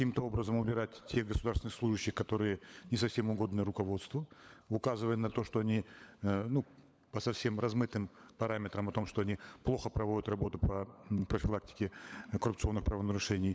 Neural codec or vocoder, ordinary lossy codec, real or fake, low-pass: codec, 16 kHz, 16 kbps, FunCodec, trained on LibriTTS, 50 frames a second; none; fake; none